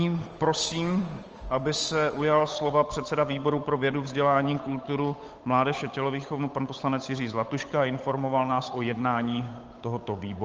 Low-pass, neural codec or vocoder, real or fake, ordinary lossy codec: 7.2 kHz; codec, 16 kHz, 8 kbps, FunCodec, trained on Chinese and English, 25 frames a second; fake; Opus, 24 kbps